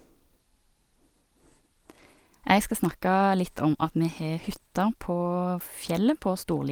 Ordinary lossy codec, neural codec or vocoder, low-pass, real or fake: Opus, 24 kbps; none; 19.8 kHz; real